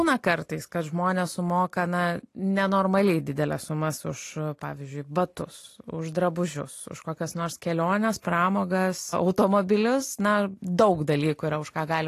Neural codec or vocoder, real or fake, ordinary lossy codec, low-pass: none; real; AAC, 48 kbps; 14.4 kHz